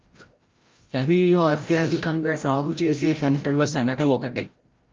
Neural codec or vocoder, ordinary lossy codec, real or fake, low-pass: codec, 16 kHz, 0.5 kbps, FreqCodec, larger model; Opus, 24 kbps; fake; 7.2 kHz